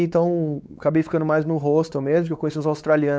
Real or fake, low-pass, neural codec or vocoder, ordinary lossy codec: fake; none; codec, 16 kHz, 4 kbps, X-Codec, WavLM features, trained on Multilingual LibriSpeech; none